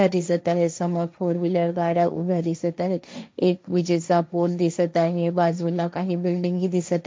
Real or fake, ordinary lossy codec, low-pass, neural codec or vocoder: fake; none; none; codec, 16 kHz, 1.1 kbps, Voila-Tokenizer